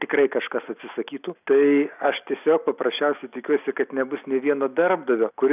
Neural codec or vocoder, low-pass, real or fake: none; 3.6 kHz; real